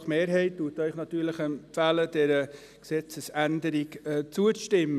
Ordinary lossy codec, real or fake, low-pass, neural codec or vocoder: none; real; 14.4 kHz; none